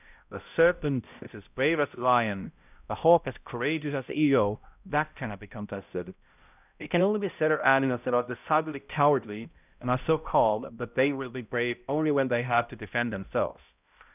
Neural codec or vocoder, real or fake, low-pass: codec, 16 kHz, 0.5 kbps, X-Codec, HuBERT features, trained on balanced general audio; fake; 3.6 kHz